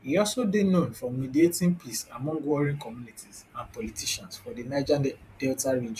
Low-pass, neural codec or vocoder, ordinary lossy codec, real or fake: 14.4 kHz; vocoder, 44.1 kHz, 128 mel bands every 512 samples, BigVGAN v2; none; fake